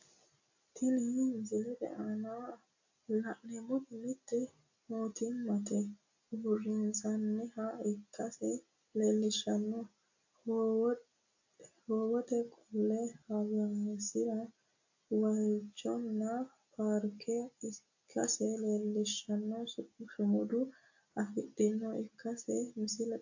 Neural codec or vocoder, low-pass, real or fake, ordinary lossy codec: none; 7.2 kHz; real; AAC, 48 kbps